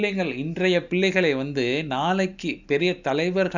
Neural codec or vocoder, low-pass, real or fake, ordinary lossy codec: none; 7.2 kHz; real; none